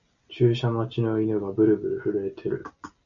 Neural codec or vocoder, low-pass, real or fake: none; 7.2 kHz; real